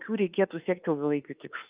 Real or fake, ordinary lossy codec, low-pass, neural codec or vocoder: fake; Opus, 24 kbps; 3.6 kHz; autoencoder, 48 kHz, 32 numbers a frame, DAC-VAE, trained on Japanese speech